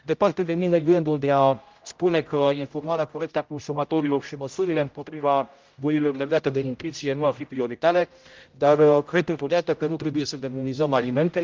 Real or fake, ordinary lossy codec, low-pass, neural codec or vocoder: fake; Opus, 24 kbps; 7.2 kHz; codec, 16 kHz, 0.5 kbps, X-Codec, HuBERT features, trained on general audio